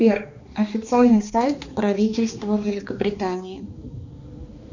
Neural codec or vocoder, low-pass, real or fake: codec, 16 kHz, 2 kbps, X-Codec, HuBERT features, trained on balanced general audio; 7.2 kHz; fake